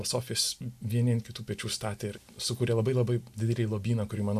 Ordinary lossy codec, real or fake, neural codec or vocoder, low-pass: AAC, 96 kbps; real; none; 14.4 kHz